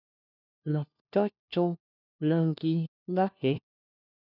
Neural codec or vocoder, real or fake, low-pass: codec, 16 kHz, 1 kbps, FunCodec, trained on LibriTTS, 50 frames a second; fake; 5.4 kHz